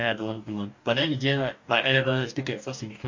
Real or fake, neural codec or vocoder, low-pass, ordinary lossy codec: fake; codec, 44.1 kHz, 2.6 kbps, DAC; 7.2 kHz; MP3, 48 kbps